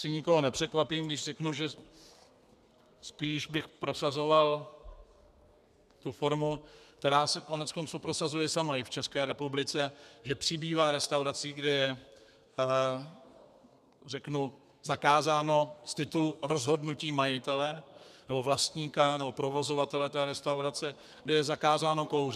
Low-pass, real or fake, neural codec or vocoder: 14.4 kHz; fake; codec, 32 kHz, 1.9 kbps, SNAC